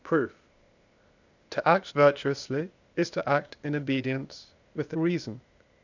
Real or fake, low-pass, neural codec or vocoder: fake; 7.2 kHz; codec, 16 kHz, 0.8 kbps, ZipCodec